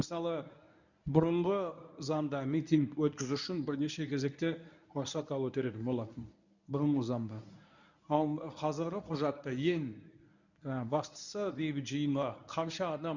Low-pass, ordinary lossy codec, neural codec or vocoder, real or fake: 7.2 kHz; none; codec, 24 kHz, 0.9 kbps, WavTokenizer, medium speech release version 1; fake